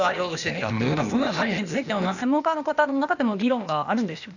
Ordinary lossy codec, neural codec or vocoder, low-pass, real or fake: none; codec, 16 kHz, 0.8 kbps, ZipCodec; 7.2 kHz; fake